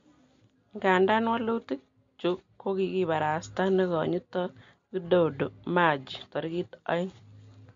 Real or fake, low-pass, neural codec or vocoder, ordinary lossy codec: real; 7.2 kHz; none; MP3, 48 kbps